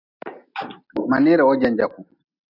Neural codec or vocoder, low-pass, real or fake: none; 5.4 kHz; real